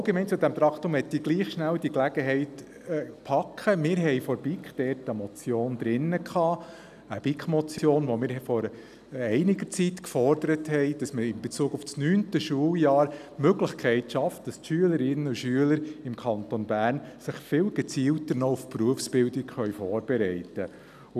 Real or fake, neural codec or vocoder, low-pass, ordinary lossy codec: real; none; 14.4 kHz; none